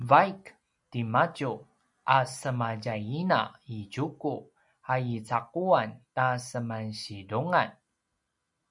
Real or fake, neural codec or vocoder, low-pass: real; none; 10.8 kHz